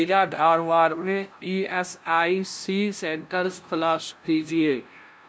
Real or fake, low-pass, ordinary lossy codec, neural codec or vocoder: fake; none; none; codec, 16 kHz, 0.5 kbps, FunCodec, trained on LibriTTS, 25 frames a second